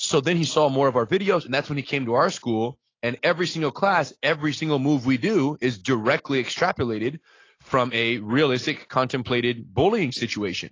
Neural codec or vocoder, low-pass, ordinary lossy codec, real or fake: none; 7.2 kHz; AAC, 32 kbps; real